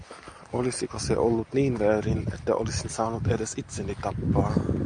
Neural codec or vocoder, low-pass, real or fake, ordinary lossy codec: none; 9.9 kHz; real; Opus, 64 kbps